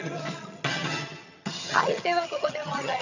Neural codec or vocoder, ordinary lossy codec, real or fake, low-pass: vocoder, 22.05 kHz, 80 mel bands, HiFi-GAN; none; fake; 7.2 kHz